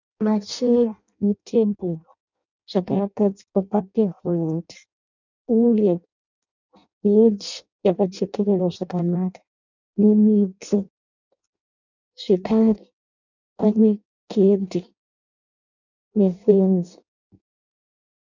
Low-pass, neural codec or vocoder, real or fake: 7.2 kHz; codec, 16 kHz in and 24 kHz out, 0.6 kbps, FireRedTTS-2 codec; fake